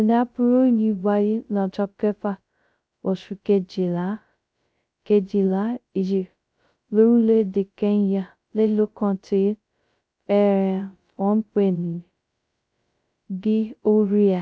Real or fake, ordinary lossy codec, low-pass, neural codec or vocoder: fake; none; none; codec, 16 kHz, 0.2 kbps, FocalCodec